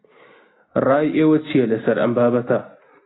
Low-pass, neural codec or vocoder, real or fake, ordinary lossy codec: 7.2 kHz; none; real; AAC, 16 kbps